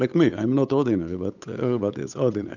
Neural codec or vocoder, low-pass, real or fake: codec, 16 kHz, 8 kbps, FunCodec, trained on Chinese and English, 25 frames a second; 7.2 kHz; fake